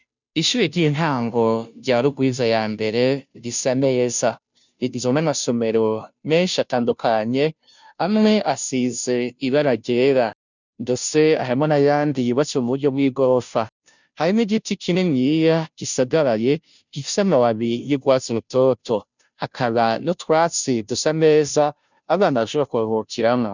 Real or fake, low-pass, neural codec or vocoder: fake; 7.2 kHz; codec, 16 kHz, 0.5 kbps, FunCodec, trained on Chinese and English, 25 frames a second